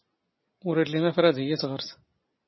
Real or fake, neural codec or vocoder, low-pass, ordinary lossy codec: real; none; 7.2 kHz; MP3, 24 kbps